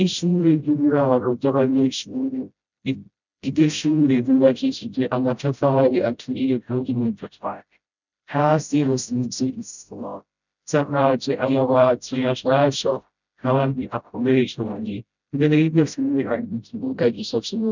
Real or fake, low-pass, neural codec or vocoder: fake; 7.2 kHz; codec, 16 kHz, 0.5 kbps, FreqCodec, smaller model